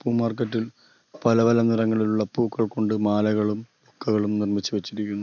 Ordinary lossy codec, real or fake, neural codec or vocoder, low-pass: none; real; none; 7.2 kHz